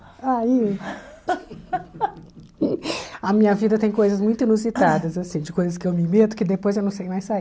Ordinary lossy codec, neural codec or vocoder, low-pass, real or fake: none; none; none; real